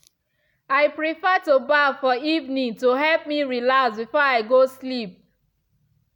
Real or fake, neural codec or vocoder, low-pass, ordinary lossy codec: real; none; 19.8 kHz; none